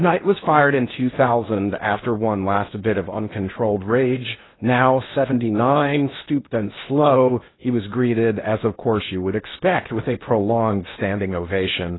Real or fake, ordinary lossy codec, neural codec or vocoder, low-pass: fake; AAC, 16 kbps; codec, 16 kHz in and 24 kHz out, 0.6 kbps, FocalCodec, streaming, 4096 codes; 7.2 kHz